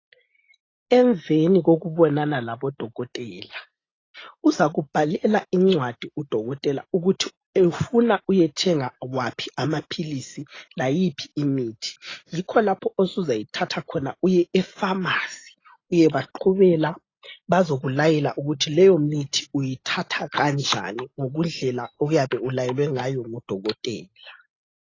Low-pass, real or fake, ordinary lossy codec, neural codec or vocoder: 7.2 kHz; real; AAC, 32 kbps; none